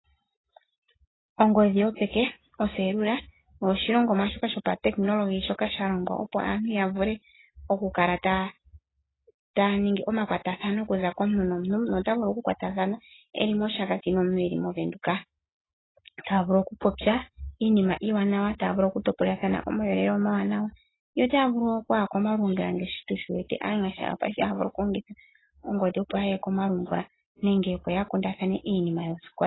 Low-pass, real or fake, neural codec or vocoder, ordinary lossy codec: 7.2 kHz; real; none; AAC, 16 kbps